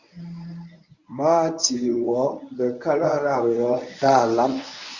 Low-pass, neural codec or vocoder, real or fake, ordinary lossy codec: 7.2 kHz; codec, 24 kHz, 0.9 kbps, WavTokenizer, medium speech release version 1; fake; Opus, 64 kbps